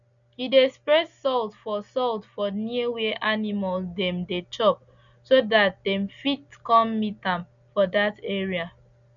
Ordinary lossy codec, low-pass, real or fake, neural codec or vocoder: none; 7.2 kHz; real; none